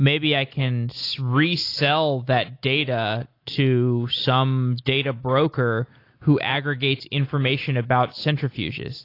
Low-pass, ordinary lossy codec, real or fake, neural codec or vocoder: 5.4 kHz; AAC, 32 kbps; real; none